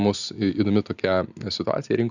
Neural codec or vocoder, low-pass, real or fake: none; 7.2 kHz; real